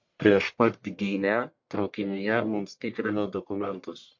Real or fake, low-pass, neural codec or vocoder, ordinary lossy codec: fake; 7.2 kHz; codec, 44.1 kHz, 1.7 kbps, Pupu-Codec; MP3, 48 kbps